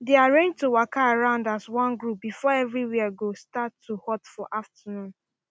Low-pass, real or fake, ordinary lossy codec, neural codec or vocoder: none; real; none; none